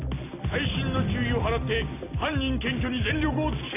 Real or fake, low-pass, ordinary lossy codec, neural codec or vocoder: real; 3.6 kHz; AAC, 24 kbps; none